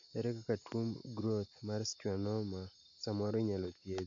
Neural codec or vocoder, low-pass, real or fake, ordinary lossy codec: none; 7.2 kHz; real; AAC, 48 kbps